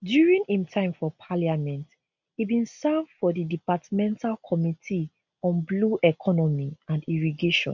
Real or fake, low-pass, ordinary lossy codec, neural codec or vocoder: real; 7.2 kHz; none; none